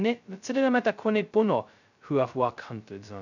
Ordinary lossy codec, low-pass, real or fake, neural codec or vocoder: none; 7.2 kHz; fake; codec, 16 kHz, 0.2 kbps, FocalCodec